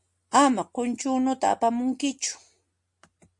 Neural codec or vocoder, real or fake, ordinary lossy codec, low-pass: none; real; MP3, 48 kbps; 10.8 kHz